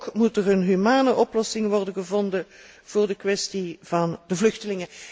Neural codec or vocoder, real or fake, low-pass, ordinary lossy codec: none; real; none; none